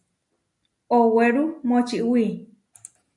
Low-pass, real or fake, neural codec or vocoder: 10.8 kHz; real; none